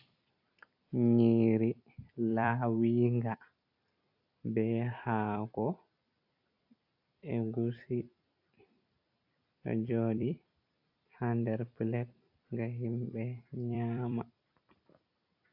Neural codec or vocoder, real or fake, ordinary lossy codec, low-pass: vocoder, 44.1 kHz, 128 mel bands every 256 samples, BigVGAN v2; fake; AAC, 48 kbps; 5.4 kHz